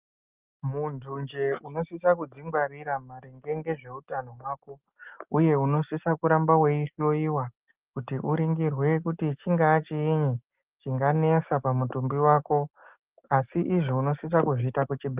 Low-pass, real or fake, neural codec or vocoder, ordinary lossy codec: 3.6 kHz; real; none; Opus, 32 kbps